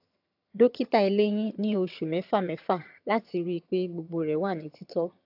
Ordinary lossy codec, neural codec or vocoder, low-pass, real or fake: AAC, 48 kbps; vocoder, 22.05 kHz, 80 mel bands, HiFi-GAN; 5.4 kHz; fake